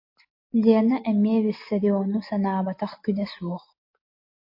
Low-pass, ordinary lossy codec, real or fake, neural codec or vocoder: 5.4 kHz; MP3, 32 kbps; real; none